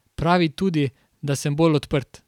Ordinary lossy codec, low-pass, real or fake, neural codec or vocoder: none; 19.8 kHz; fake; vocoder, 44.1 kHz, 128 mel bands every 256 samples, BigVGAN v2